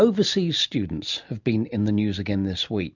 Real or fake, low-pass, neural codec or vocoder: real; 7.2 kHz; none